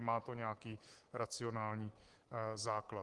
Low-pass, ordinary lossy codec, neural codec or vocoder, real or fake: 10.8 kHz; Opus, 24 kbps; codec, 24 kHz, 3.1 kbps, DualCodec; fake